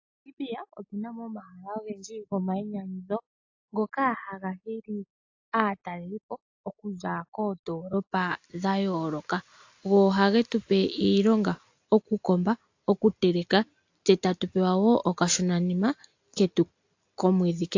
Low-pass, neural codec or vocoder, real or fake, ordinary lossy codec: 7.2 kHz; none; real; AAC, 48 kbps